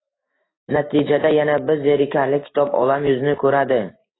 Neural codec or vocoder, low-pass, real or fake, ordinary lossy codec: autoencoder, 48 kHz, 128 numbers a frame, DAC-VAE, trained on Japanese speech; 7.2 kHz; fake; AAC, 16 kbps